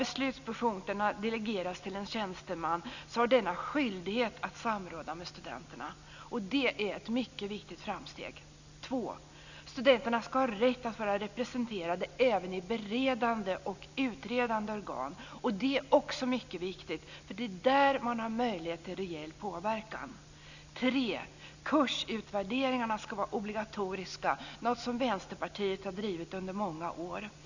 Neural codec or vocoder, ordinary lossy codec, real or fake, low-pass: none; none; real; 7.2 kHz